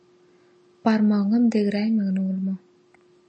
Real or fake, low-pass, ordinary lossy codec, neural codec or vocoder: real; 9.9 kHz; MP3, 32 kbps; none